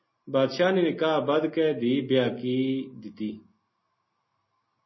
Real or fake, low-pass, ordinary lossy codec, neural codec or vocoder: real; 7.2 kHz; MP3, 24 kbps; none